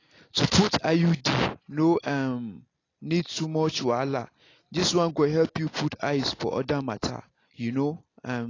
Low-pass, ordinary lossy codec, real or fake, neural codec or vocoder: 7.2 kHz; AAC, 32 kbps; real; none